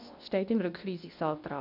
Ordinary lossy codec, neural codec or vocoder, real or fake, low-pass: none; codec, 24 kHz, 0.9 kbps, WavTokenizer, medium speech release version 2; fake; 5.4 kHz